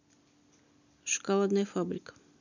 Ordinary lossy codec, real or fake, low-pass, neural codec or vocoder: none; real; 7.2 kHz; none